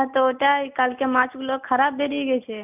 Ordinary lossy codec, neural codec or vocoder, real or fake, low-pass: none; none; real; 3.6 kHz